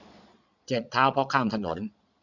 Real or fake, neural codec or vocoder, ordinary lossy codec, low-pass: fake; vocoder, 22.05 kHz, 80 mel bands, Vocos; none; 7.2 kHz